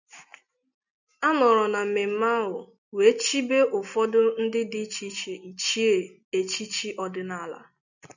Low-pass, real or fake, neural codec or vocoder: 7.2 kHz; real; none